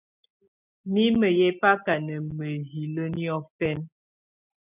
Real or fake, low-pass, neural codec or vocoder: real; 3.6 kHz; none